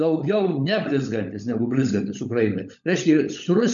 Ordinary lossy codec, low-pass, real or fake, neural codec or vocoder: MP3, 96 kbps; 7.2 kHz; fake; codec, 16 kHz, 16 kbps, FunCodec, trained on LibriTTS, 50 frames a second